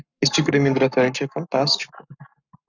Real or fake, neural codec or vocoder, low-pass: fake; codec, 44.1 kHz, 7.8 kbps, Pupu-Codec; 7.2 kHz